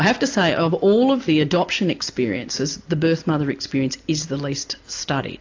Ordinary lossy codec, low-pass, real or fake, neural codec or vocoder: AAC, 48 kbps; 7.2 kHz; real; none